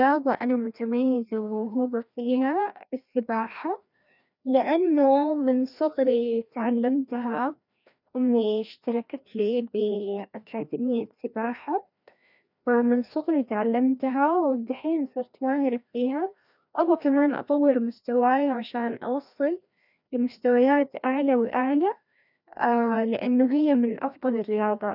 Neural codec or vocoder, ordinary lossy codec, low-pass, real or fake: codec, 16 kHz, 1 kbps, FreqCodec, larger model; none; 5.4 kHz; fake